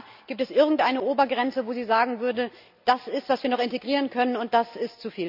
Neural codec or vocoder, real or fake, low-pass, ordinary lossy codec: none; real; 5.4 kHz; none